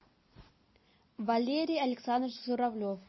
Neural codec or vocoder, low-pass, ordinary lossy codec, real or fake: none; 7.2 kHz; MP3, 24 kbps; real